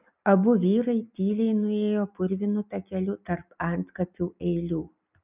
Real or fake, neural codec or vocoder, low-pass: real; none; 3.6 kHz